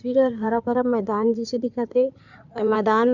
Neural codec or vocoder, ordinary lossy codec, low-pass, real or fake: codec, 16 kHz in and 24 kHz out, 2.2 kbps, FireRedTTS-2 codec; none; 7.2 kHz; fake